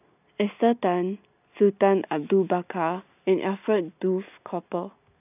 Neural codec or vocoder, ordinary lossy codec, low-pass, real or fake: none; none; 3.6 kHz; real